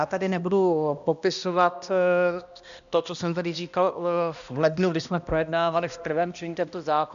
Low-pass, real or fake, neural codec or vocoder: 7.2 kHz; fake; codec, 16 kHz, 1 kbps, X-Codec, HuBERT features, trained on balanced general audio